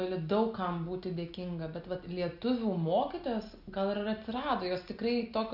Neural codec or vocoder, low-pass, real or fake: none; 5.4 kHz; real